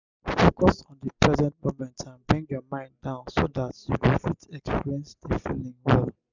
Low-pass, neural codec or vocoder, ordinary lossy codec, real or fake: 7.2 kHz; none; none; real